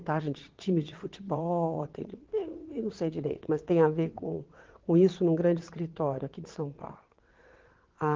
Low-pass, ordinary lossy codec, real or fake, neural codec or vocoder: 7.2 kHz; Opus, 16 kbps; fake; vocoder, 22.05 kHz, 80 mel bands, WaveNeXt